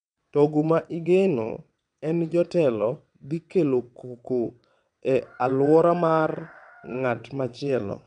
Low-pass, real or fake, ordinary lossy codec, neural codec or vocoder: 9.9 kHz; fake; none; vocoder, 22.05 kHz, 80 mel bands, WaveNeXt